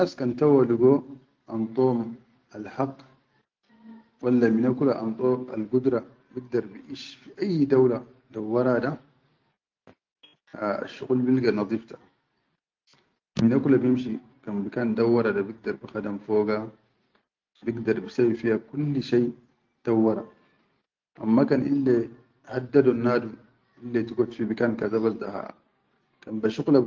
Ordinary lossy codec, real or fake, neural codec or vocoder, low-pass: Opus, 24 kbps; real; none; 7.2 kHz